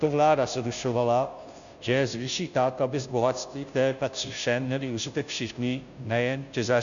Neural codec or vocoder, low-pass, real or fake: codec, 16 kHz, 0.5 kbps, FunCodec, trained on Chinese and English, 25 frames a second; 7.2 kHz; fake